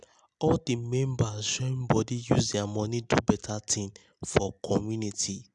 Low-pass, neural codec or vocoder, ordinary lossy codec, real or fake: 10.8 kHz; none; none; real